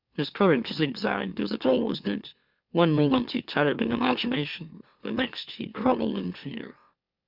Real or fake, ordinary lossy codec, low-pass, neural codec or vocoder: fake; Opus, 64 kbps; 5.4 kHz; autoencoder, 44.1 kHz, a latent of 192 numbers a frame, MeloTTS